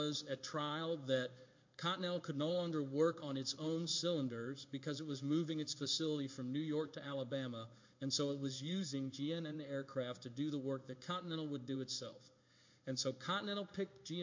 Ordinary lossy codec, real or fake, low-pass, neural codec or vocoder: MP3, 48 kbps; fake; 7.2 kHz; codec, 16 kHz in and 24 kHz out, 1 kbps, XY-Tokenizer